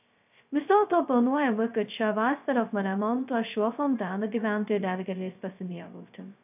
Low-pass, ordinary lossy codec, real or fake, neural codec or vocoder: 3.6 kHz; AAC, 32 kbps; fake; codec, 16 kHz, 0.2 kbps, FocalCodec